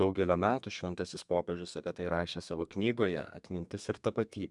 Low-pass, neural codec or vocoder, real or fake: 10.8 kHz; codec, 32 kHz, 1.9 kbps, SNAC; fake